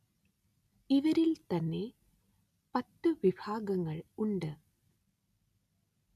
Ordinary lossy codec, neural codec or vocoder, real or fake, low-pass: none; none; real; 14.4 kHz